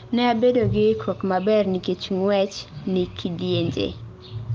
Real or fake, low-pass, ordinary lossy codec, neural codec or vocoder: real; 7.2 kHz; Opus, 32 kbps; none